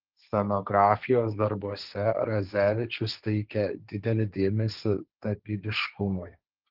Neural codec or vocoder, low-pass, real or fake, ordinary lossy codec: codec, 16 kHz, 1.1 kbps, Voila-Tokenizer; 5.4 kHz; fake; Opus, 32 kbps